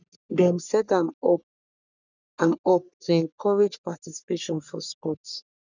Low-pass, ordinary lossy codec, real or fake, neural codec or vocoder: 7.2 kHz; none; fake; codec, 44.1 kHz, 3.4 kbps, Pupu-Codec